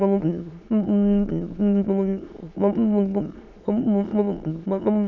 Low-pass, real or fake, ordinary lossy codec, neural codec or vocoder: 7.2 kHz; fake; none; autoencoder, 22.05 kHz, a latent of 192 numbers a frame, VITS, trained on many speakers